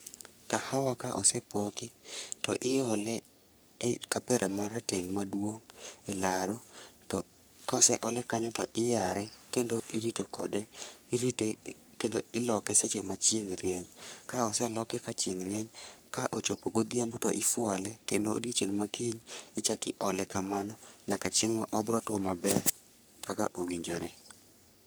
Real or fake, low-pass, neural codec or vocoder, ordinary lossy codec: fake; none; codec, 44.1 kHz, 3.4 kbps, Pupu-Codec; none